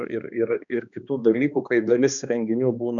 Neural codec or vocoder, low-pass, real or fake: codec, 16 kHz, 2 kbps, X-Codec, HuBERT features, trained on balanced general audio; 7.2 kHz; fake